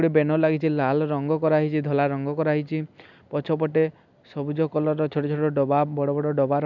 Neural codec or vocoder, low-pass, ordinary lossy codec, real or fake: none; 7.2 kHz; none; real